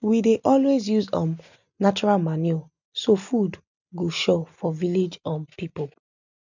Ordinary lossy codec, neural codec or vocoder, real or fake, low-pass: none; none; real; 7.2 kHz